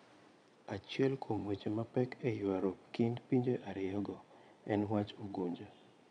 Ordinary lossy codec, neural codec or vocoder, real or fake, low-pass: none; vocoder, 22.05 kHz, 80 mel bands, Vocos; fake; 9.9 kHz